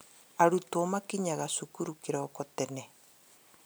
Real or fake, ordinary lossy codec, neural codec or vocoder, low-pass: real; none; none; none